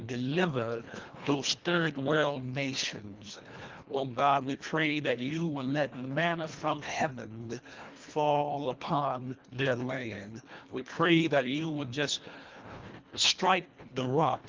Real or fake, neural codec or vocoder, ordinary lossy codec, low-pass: fake; codec, 24 kHz, 1.5 kbps, HILCodec; Opus, 32 kbps; 7.2 kHz